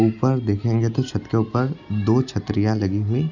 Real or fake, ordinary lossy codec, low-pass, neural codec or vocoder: real; none; 7.2 kHz; none